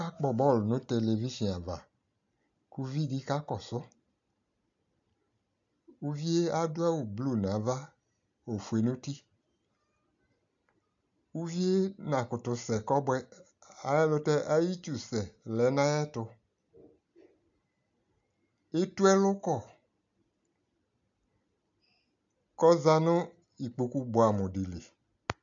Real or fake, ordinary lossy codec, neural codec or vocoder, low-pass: real; MP3, 64 kbps; none; 7.2 kHz